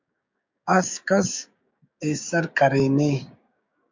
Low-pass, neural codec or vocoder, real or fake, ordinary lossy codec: 7.2 kHz; codec, 16 kHz, 6 kbps, DAC; fake; MP3, 64 kbps